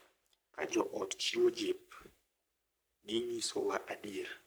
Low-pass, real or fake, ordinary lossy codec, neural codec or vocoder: none; fake; none; codec, 44.1 kHz, 3.4 kbps, Pupu-Codec